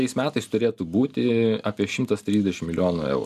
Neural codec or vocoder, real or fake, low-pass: none; real; 14.4 kHz